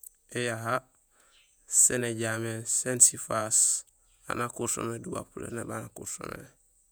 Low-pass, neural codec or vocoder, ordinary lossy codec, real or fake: none; vocoder, 48 kHz, 128 mel bands, Vocos; none; fake